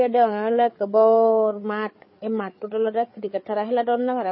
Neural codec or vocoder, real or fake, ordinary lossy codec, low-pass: codec, 16 kHz, 8 kbps, FreqCodec, larger model; fake; MP3, 24 kbps; 7.2 kHz